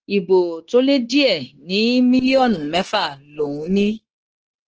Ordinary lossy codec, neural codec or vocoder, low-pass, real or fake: Opus, 16 kbps; codec, 16 kHz, 0.9 kbps, LongCat-Audio-Codec; 7.2 kHz; fake